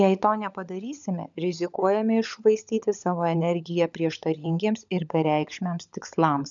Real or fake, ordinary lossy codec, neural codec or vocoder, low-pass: fake; MP3, 96 kbps; codec, 16 kHz, 16 kbps, FunCodec, trained on LibriTTS, 50 frames a second; 7.2 kHz